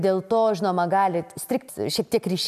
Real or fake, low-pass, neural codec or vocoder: real; 14.4 kHz; none